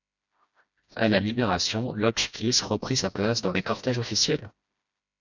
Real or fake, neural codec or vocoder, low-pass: fake; codec, 16 kHz, 1 kbps, FreqCodec, smaller model; 7.2 kHz